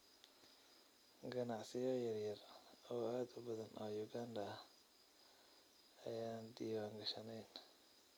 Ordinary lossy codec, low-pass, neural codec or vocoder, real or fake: none; none; none; real